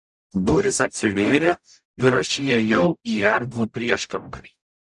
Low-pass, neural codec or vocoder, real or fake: 10.8 kHz; codec, 44.1 kHz, 0.9 kbps, DAC; fake